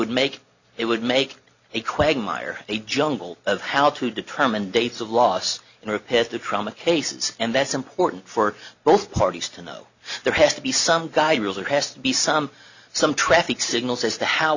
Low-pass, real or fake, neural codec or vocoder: 7.2 kHz; real; none